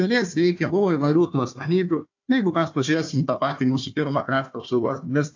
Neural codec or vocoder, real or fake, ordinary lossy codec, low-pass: codec, 16 kHz, 1 kbps, FunCodec, trained on Chinese and English, 50 frames a second; fake; AAC, 48 kbps; 7.2 kHz